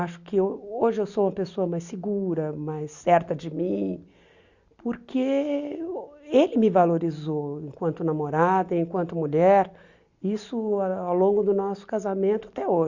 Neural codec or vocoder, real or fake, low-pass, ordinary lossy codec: none; real; 7.2 kHz; Opus, 64 kbps